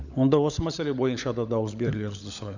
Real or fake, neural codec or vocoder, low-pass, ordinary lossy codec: fake; codec, 16 kHz, 16 kbps, FunCodec, trained on LibriTTS, 50 frames a second; 7.2 kHz; none